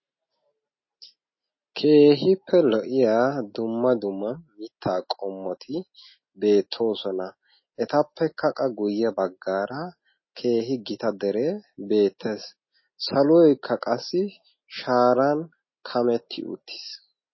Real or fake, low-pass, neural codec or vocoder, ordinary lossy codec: real; 7.2 kHz; none; MP3, 24 kbps